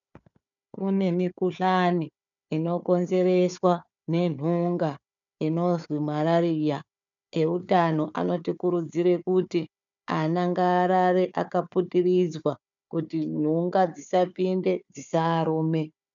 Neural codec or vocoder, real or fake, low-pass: codec, 16 kHz, 4 kbps, FunCodec, trained on Chinese and English, 50 frames a second; fake; 7.2 kHz